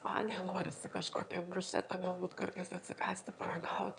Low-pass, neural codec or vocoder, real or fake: 9.9 kHz; autoencoder, 22.05 kHz, a latent of 192 numbers a frame, VITS, trained on one speaker; fake